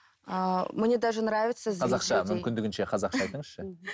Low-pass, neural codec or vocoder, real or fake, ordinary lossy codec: none; none; real; none